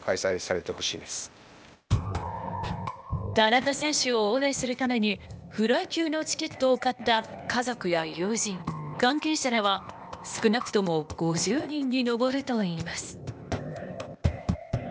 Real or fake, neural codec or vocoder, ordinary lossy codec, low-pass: fake; codec, 16 kHz, 0.8 kbps, ZipCodec; none; none